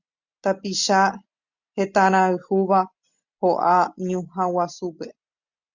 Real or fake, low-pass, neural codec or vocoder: real; 7.2 kHz; none